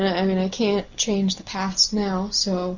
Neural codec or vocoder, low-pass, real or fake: none; 7.2 kHz; real